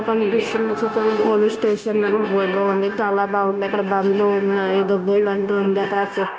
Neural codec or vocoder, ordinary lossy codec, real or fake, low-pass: codec, 16 kHz, 0.9 kbps, LongCat-Audio-Codec; none; fake; none